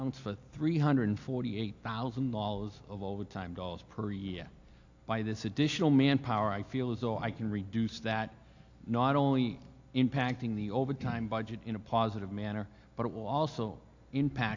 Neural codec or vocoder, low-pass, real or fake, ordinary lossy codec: none; 7.2 kHz; real; AAC, 48 kbps